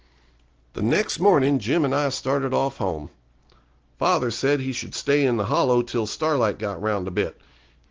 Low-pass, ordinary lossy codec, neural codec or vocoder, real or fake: 7.2 kHz; Opus, 16 kbps; none; real